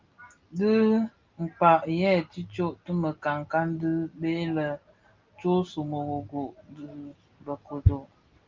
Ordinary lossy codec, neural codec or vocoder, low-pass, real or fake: Opus, 24 kbps; none; 7.2 kHz; real